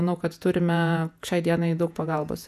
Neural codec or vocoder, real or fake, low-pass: vocoder, 48 kHz, 128 mel bands, Vocos; fake; 14.4 kHz